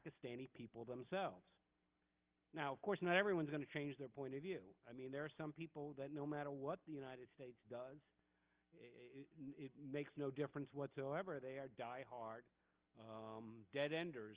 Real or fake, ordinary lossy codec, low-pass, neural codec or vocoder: real; Opus, 32 kbps; 3.6 kHz; none